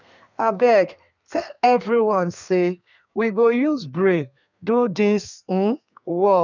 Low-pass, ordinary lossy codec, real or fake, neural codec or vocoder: 7.2 kHz; none; fake; codec, 32 kHz, 1.9 kbps, SNAC